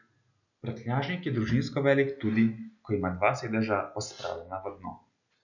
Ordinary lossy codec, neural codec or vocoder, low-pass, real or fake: none; none; 7.2 kHz; real